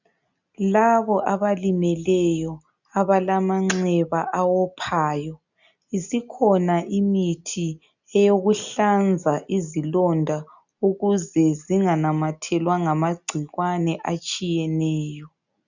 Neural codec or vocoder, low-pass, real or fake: none; 7.2 kHz; real